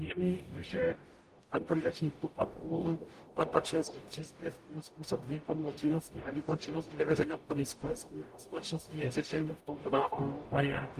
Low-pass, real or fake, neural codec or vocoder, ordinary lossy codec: 14.4 kHz; fake; codec, 44.1 kHz, 0.9 kbps, DAC; Opus, 32 kbps